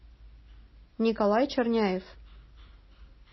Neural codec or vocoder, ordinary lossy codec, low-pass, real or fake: none; MP3, 24 kbps; 7.2 kHz; real